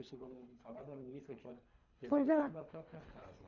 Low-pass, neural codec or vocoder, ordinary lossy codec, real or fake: 7.2 kHz; codec, 24 kHz, 3 kbps, HILCodec; none; fake